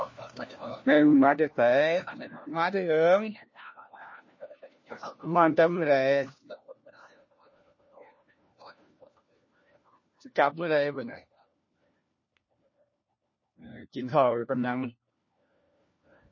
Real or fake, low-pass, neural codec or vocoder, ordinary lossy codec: fake; 7.2 kHz; codec, 16 kHz, 1 kbps, FunCodec, trained on LibriTTS, 50 frames a second; MP3, 32 kbps